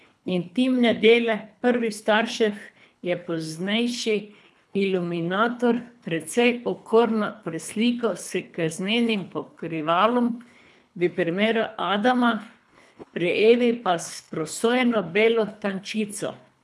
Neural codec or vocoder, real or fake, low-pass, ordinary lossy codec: codec, 24 kHz, 3 kbps, HILCodec; fake; none; none